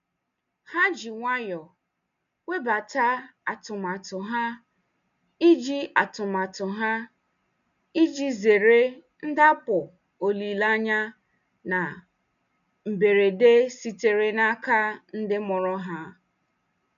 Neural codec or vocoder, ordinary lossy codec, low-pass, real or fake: none; none; 7.2 kHz; real